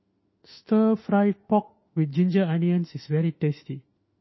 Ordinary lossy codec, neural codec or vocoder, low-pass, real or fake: MP3, 24 kbps; autoencoder, 48 kHz, 32 numbers a frame, DAC-VAE, trained on Japanese speech; 7.2 kHz; fake